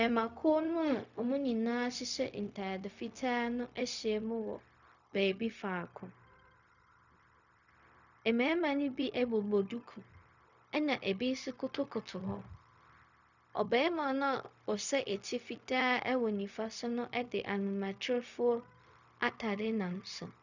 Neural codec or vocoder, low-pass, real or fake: codec, 16 kHz, 0.4 kbps, LongCat-Audio-Codec; 7.2 kHz; fake